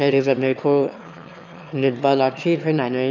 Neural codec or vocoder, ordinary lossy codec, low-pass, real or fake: autoencoder, 22.05 kHz, a latent of 192 numbers a frame, VITS, trained on one speaker; none; 7.2 kHz; fake